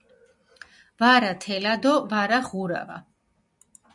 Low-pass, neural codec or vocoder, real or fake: 10.8 kHz; none; real